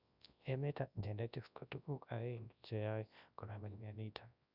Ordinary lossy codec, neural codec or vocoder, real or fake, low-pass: none; codec, 24 kHz, 0.9 kbps, WavTokenizer, large speech release; fake; 5.4 kHz